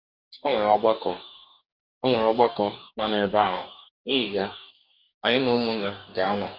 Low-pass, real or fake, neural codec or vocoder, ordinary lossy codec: 5.4 kHz; fake; codec, 44.1 kHz, 2.6 kbps, DAC; Opus, 64 kbps